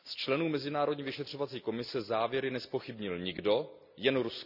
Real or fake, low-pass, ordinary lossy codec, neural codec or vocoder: real; 5.4 kHz; none; none